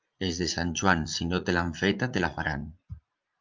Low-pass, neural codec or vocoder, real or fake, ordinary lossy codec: 7.2 kHz; vocoder, 44.1 kHz, 80 mel bands, Vocos; fake; Opus, 24 kbps